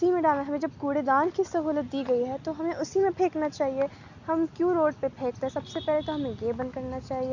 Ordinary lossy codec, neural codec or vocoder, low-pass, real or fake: none; none; 7.2 kHz; real